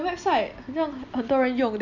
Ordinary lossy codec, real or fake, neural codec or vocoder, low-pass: none; real; none; 7.2 kHz